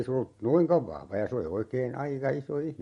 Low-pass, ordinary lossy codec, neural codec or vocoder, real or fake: 19.8 kHz; MP3, 48 kbps; vocoder, 44.1 kHz, 128 mel bands every 512 samples, BigVGAN v2; fake